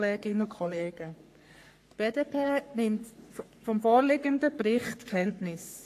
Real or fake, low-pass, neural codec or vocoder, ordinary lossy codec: fake; 14.4 kHz; codec, 44.1 kHz, 3.4 kbps, Pupu-Codec; AAC, 64 kbps